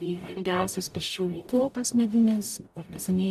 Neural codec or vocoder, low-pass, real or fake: codec, 44.1 kHz, 0.9 kbps, DAC; 14.4 kHz; fake